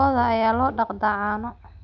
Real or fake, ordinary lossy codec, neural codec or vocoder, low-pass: real; none; none; 7.2 kHz